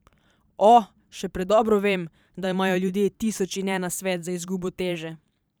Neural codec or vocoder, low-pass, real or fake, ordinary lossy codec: vocoder, 44.1 kHz, 128 mel bands every 512 samples, BigVGAN v2; none; fake; none